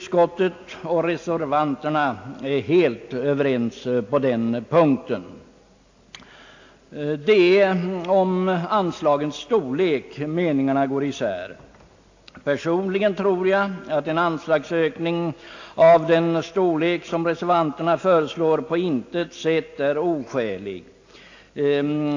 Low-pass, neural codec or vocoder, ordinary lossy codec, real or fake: 7.2 kHz; none; AAC, 48 kbps; real